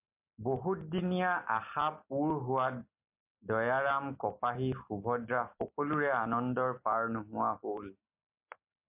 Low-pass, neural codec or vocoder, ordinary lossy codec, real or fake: 3.6 kHz; none; MP3, 32 kbps; real